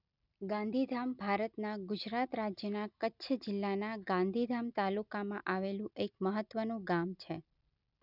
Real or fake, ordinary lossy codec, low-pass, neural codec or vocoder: real; none; 5.4 kHz; none